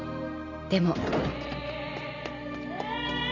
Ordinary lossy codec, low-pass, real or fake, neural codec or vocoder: none; 7.2 kHz; real; none